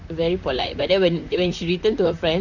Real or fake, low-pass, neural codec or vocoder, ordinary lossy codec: fake; 7.2 kHz; vocoder, 44.1 kHz, 128 mel bands, Pupu-Vocoder; none